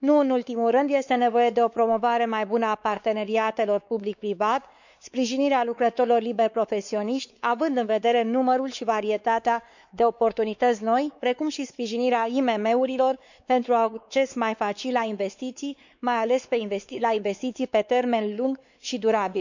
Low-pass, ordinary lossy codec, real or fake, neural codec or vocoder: 7.2 kHz; none; fake; codec, 16 kHz, 4 kbps, X-Codec, WavLM features, trained on Multilingual LibriSpeech